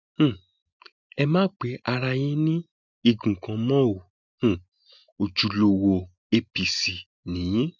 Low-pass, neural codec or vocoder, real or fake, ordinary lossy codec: 7.2 kHz; none; real; none